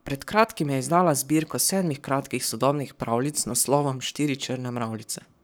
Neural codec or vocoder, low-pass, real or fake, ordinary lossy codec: codec, 44.1 kHz, 7.8 kbps, Pupu-Codec; none; fake; none